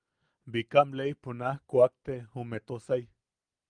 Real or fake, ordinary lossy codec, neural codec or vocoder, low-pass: real; Opus, 32 kbps; none; 9.9 kHz